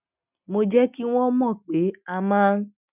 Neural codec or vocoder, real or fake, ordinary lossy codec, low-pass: none; real; none; 3.6 kHz